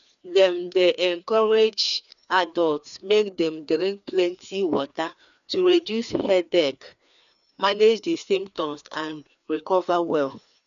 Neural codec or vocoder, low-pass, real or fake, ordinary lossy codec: codec, 16 kHz, 2 kbps, FreqCodec, larger model; 7.2 kHz; fake; none